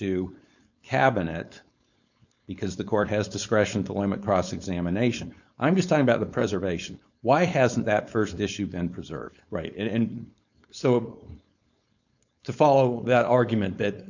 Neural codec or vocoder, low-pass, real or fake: codec, 16 kHz, 4.8 kbps, FACodec; 7.2 kHz; fake